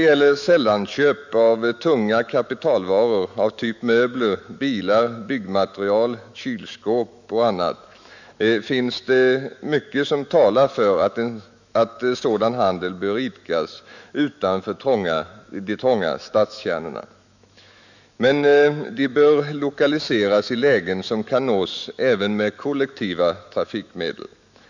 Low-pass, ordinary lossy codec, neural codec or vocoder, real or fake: 7.2 kHz; none; autoencoder, 48 kHz, 128 numbers a frame, DAC-VAE, trained on Japanese speech; fake